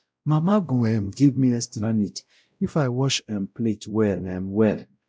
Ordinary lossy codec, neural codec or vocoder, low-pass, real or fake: none; codec, 16 kHz, 0.5 kbps, X-Codec, WavLM features, trained on Multilingual LibriSpeech; none; fake